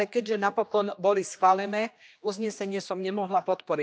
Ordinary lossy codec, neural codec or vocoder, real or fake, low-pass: none; codec, 16 kHz, 2 kbps, X-Codec, HuBERT features, trained on general audio; fake; none